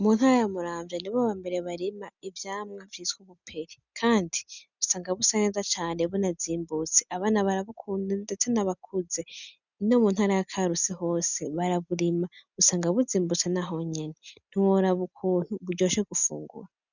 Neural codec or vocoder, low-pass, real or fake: none; 7.2 kHz; real